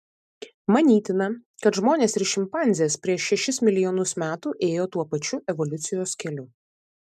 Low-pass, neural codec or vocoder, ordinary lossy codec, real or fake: 14.4 kHz; none; MP3, 96 kbps; real